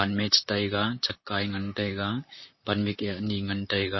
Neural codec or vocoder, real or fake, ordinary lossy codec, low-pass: vocoder, 44.1 kHz, 128 mel bands, Pupu-Vocoder; fake; MP3, 24 kbps; 7.2 kHz